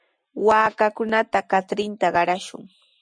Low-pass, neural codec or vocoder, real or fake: 9.9 kHz; none; real